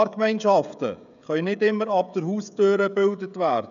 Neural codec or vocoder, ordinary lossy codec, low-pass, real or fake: codec, 16 kHz, 16 kbps, FreqCodec, smaller model; none; 7.2 kHz; fake